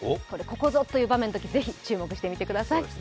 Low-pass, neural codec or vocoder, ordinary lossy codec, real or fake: none; none; none; real